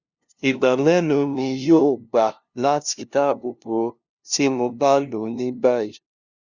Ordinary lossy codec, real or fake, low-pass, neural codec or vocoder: Opus, 64 kbps; fake; 7.2 kHz; codec, 16 kHz, 0.5 kbps, FunCodec, trained on LibriTTS, 25 frames a second